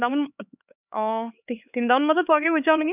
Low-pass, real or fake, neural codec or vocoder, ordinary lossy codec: 3.6 kHz; fake; codec, 16 kHz, 4 kbps, X-Codec, HuBERT features, trained on LibriSpeech; none